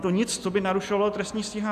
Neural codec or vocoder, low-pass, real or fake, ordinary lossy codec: none; 14.4 kHz; real; AAC, 96 kbps